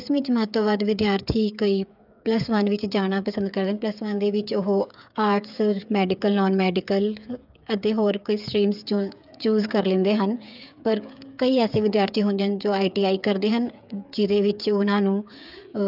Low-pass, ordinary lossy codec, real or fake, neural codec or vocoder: 5.4 kHz; none; fake; codec, 16 kHz, 8 kbps, FreqCodec, smaller model